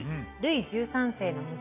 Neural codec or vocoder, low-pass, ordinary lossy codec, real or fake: none; 3.6 kHz; AAC, 24 kbps; real